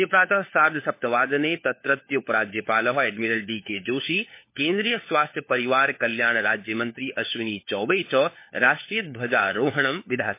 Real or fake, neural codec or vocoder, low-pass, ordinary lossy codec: fake; codec, 16 kHz, 4 kbps, FunCodec, trained on LibriTTS, 50 frames a second; 3.6 kHz; MP3, 24 kbps